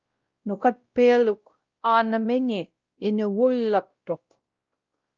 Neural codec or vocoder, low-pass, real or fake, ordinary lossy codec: codec, 16 kHz, 0.5 kbps, X-Codec, WavLM features, trained on Multilingual LibriSpeech; 7.2 kHz; fake; Opus, 24 kbps